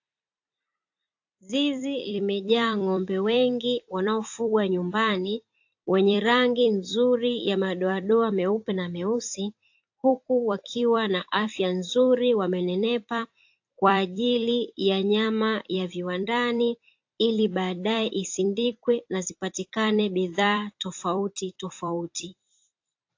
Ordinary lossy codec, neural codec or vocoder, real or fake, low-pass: AAC, 48 kbps; vocoder, 44.1 kHz, 128 mel bands every 256 samples, BigVGAN v2; fake; 7.2 kHz